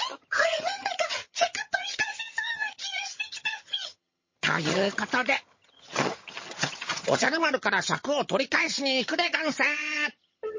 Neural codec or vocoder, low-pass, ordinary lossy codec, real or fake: vocoder, 22.05 kHz, 80 mel bands, HiFi-GAN; 7.2 kHz; MP3, 32 kbps; fake